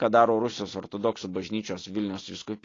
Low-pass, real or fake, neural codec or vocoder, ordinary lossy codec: 7.2 kHz; real; none; AAC, 32 kbps